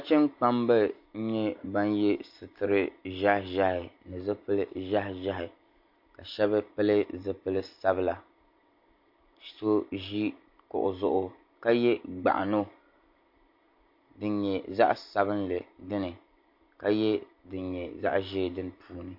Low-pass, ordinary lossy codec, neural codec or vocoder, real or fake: 5.4 kHz; MP3, 32 kbps; none; real